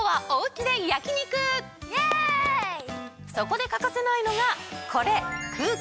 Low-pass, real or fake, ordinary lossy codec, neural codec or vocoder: none; real; none; none